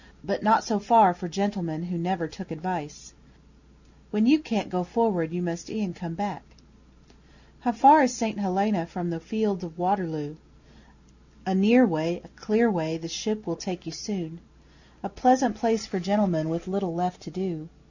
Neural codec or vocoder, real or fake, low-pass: none; real; 7.2 kHz